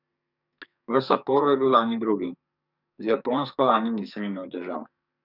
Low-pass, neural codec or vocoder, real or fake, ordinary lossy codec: 5.4 kHz; codec, 44.1 kHz, 2.6 kbps, SNAC; fake; none